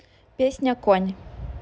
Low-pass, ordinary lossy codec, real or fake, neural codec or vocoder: none; none; real; none